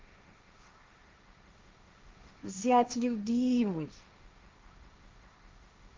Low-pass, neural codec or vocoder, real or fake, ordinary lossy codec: 7.2 kHz; codec, 16 kHz, 1.1 kbps, Voila-Tokenizer; fake; Opus, 24 kbps